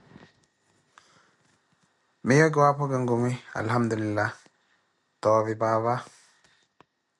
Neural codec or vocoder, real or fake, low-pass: none; real; 10.8 kHz